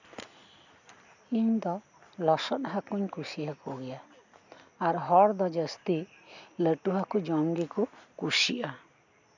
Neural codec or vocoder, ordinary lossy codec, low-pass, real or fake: none; none; 7.2 kHz; real